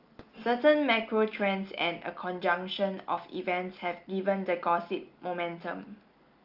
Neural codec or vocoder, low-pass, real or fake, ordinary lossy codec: none; 5.4 kHz; real; Opus, 24 kbps